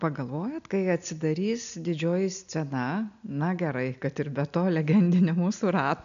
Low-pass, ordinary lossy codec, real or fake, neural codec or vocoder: 7.2 kHz; MP3, 96 kbps; real; none